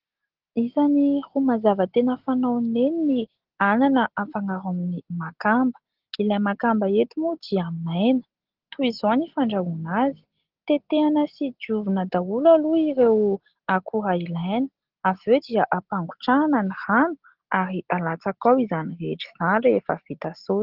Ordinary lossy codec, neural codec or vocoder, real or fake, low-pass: Opus, 16 kbps; none; real; 5.4 kHz